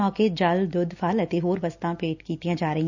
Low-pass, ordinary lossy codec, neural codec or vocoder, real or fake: 7.2 kHz; none; none; real